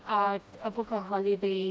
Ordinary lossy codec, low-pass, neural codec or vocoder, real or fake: none; none; codec, 16 kHz, 1 kbps, FreqCodec, smaller model; fake